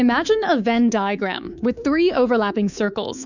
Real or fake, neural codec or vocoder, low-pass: fake; codec, 24 kHz, 3.1 kbps, DualCodec; 7.2 kHz